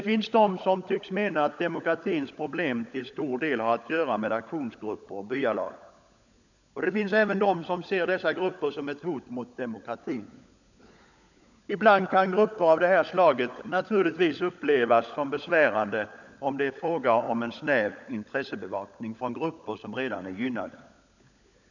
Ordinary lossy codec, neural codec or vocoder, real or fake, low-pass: none; codec, 16 kHz, 16 kbps, FunCodec, trained on LibriTTS, 50 frames a second; fake; 7.2 kHz